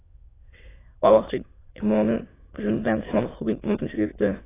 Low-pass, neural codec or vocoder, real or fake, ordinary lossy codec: 3.6 kHz; autoencoder, 22.05 kHz, a latent of 192 numbers a frame, VITS, trained on many speakers; fake; AAC, 16 kbps